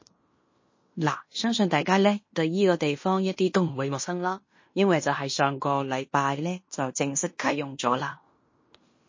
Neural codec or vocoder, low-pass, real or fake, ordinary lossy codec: codec, 16 kHz in and 24 kHz out, 0.9 kbps, LongCat-Audio-Codec, fine tuned four codebook decoder; 7.2 kHz; fake; MP3, 32 kbps